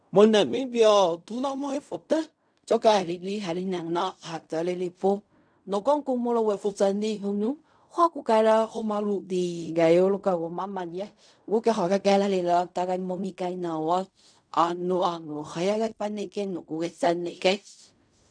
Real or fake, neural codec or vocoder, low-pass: fake; codec, 16 kHz in and 24 kHz out, 0.4 kbps, LongCat-Audio-Codec, fine tuned four codebook decoder; 9.9 kHz